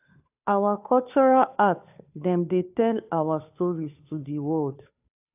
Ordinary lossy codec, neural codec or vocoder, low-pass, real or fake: AAC, 32 kbps; codec, 16 kHz, 2 kbps, FunCodec, trained on Chinese and English, 25 frames a second; 3.6 kHz; fake